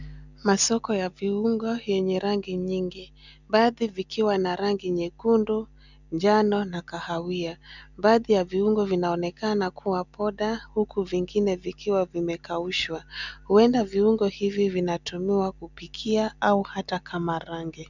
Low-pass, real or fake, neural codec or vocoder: 7.2 kHz; real; none